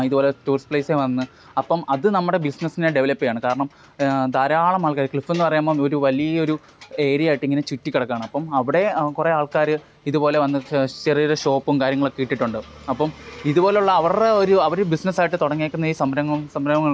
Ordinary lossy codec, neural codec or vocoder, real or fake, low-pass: none; none; real; none